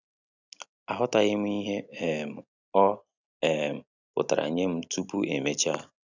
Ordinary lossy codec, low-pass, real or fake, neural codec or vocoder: none; 7.2 kHz; real; none